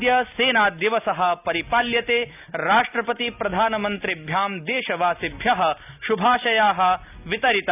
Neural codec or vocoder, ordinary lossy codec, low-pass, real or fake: none; none; 3.6 kHz; real